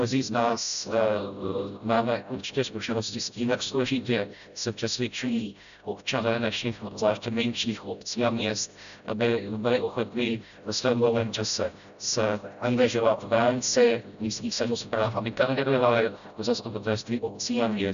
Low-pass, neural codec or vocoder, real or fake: 7.2 kHz; codec, 16 kHz, 0.5 kbps, FreqCodec, smaller model; fake